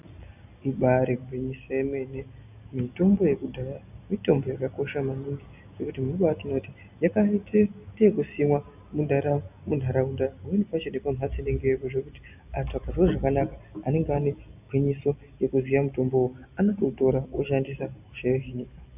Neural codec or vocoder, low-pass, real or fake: none; 3.6 kHz; real